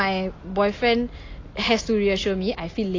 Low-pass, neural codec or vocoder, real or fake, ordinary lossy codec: 7.2 kHz; none; real; AAC, 32 kbps